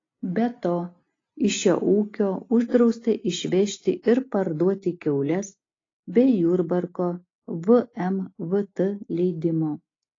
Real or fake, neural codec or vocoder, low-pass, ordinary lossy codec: real; none; 7.2 kHz; AAC, 32 kbps